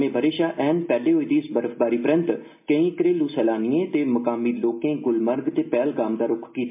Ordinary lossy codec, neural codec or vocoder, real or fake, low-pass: MP3, 32 kbps; none; real; 3.6 kHz